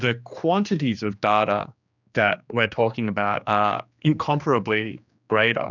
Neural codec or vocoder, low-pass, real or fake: codec, 16 kHz, 2 kbps, X-Codec, HuBERT features, trained on general audio; 7.2 kHz; fake